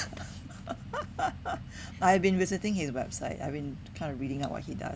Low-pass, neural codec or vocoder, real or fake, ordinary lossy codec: none; none; real; none